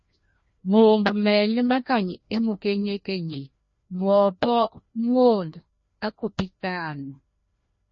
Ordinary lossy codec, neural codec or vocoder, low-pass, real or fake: MP3, 32 kbps; codec, 16 kHz, 1 kbps, FreqCodec, larger model; 7.2 kHz; fake